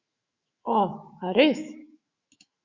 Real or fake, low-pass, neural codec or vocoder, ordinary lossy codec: fake; 7.2 kHz; autoencoder, 48 kHz, 128 numbers a frame, DAC-VAE, trained on Japanese speech; Opus, 64 kbps